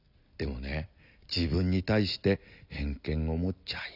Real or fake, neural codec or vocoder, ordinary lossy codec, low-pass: real; none; none; 5.4 kHz